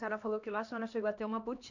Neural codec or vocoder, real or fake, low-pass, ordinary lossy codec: codec, 16 kHz, 2 kbps, X-Codec, HuBERT features, trained on LibriSpeech; fake; 7.2 kHz; none